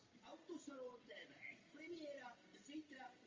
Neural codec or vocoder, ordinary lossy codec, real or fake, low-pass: none; Opus, 32 kbps; real; 7.2 kHz